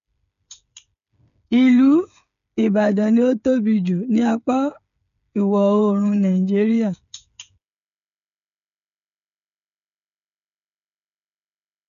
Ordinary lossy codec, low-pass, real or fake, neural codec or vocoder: none; 7.2 kHz; fake; codec, 16 kHz, 8 kbps, FreqCodec, smaller model